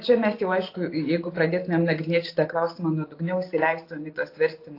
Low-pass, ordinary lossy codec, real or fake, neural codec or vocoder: 5.4 kHz; AAC, 32 kbps; real; none